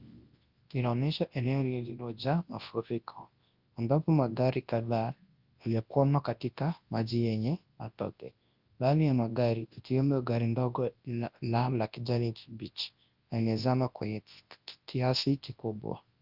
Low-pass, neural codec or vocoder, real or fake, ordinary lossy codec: 5.4 kHz; codec, 24 kHz, 0.9 kbps, WavTokenizer, large speech release; fake; Opus, 32 kbps